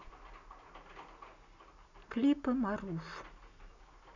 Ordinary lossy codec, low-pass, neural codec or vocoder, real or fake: none; 7.2 kHz; none; real